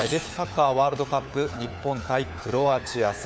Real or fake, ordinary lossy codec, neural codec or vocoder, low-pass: fake; none; codec, 16 kHz, 4 kbps, FunCodec, trained on LibriTTS, 50 frames a second; none